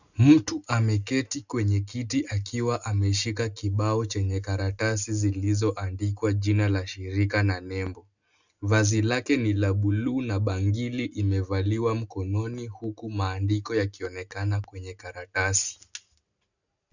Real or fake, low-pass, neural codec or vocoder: real; 7.2 kHz; none